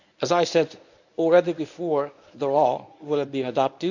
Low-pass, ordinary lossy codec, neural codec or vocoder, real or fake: 7.2 kHz; none; codec, 24 kHz, 0.9 kbps, WavTokenizer, medium speech release version 1; fake